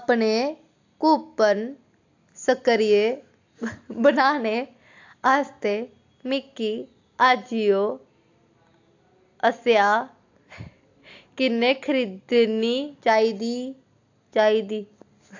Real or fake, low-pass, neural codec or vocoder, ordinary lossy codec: real; 7.2 kHz; none; AAC, 48 kbps